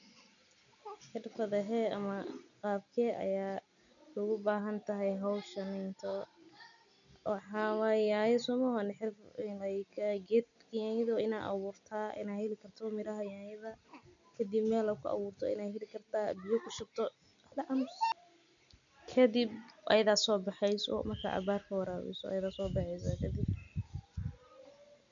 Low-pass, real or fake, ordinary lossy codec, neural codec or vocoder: 7.2 kHz; real; none; none